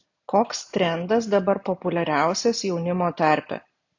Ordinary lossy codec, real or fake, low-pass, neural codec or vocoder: AAC, 48 kbps; real; 7.2 kHz; none